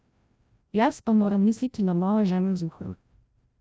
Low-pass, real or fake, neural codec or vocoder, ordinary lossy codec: none; fake; codec, 16 kHz, 0.5 kbps, FreqCodec, larger model; none